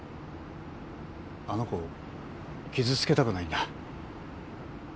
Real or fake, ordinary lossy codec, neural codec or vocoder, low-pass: real; none; none; none